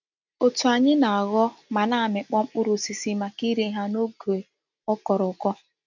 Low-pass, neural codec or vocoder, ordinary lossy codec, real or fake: 7.2 kHz; none; none; real